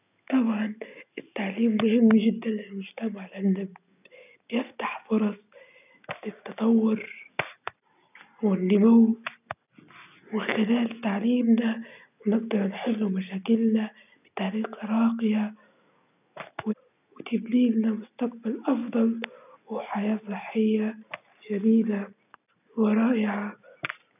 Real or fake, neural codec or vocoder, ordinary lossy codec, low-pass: real; none; none; 3.6 kHz